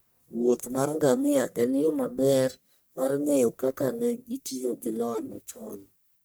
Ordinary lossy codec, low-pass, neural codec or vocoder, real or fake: none; none; codec, 44.1 kHz, 1.7 kbps, Pupu-Codec; fake